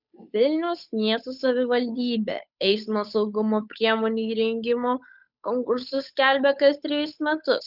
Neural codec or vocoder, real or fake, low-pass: codec, 16 kHz, 8 kbps, FunCodec, trained on Chinese and English, 25 frames a second; fake; 5.4 kHz